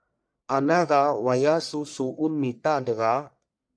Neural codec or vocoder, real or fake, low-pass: codec, 44.1 kHz, 1.7 kbps, Pupu-Codec; fake; 9.9 kHz